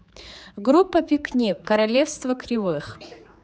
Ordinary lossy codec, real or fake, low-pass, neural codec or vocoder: none; fake; none; codec, 16 kHz, 4 kbps, X-Codec, HuBERT features, trained on general audio